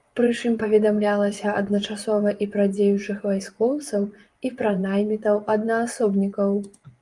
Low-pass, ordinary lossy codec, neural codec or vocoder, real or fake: 10.8 kHz; Opus, 32 kbps; vocoder, 24 kHz, 100 mel bands, Vocos; fake